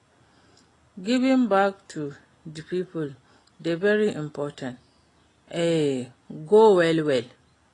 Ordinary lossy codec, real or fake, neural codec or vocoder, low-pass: AAC, 32 kbps; real; none; 10.8 kHz